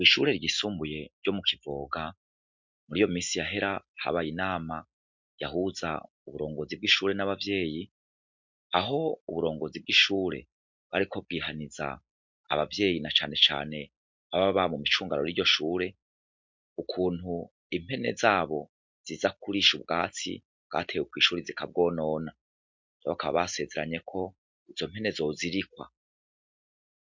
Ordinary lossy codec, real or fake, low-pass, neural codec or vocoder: MP3, 64 kbps; real; 7.2 kHz; none